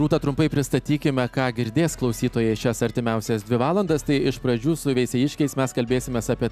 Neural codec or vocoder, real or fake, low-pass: none; real; 14.4 kHz